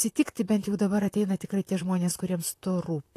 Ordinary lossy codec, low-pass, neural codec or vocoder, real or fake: AAC, 64 kbps; 14.4 kHz; vocoder, 44.1 kHz, 128 mel bands, Pupu-Vocoder; fake